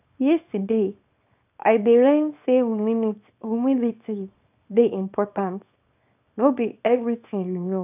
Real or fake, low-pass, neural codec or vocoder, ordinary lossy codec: fake; 3.6 kHz; codec, 24 kHz, 0.9 kbps, WavTokenizer, small release; none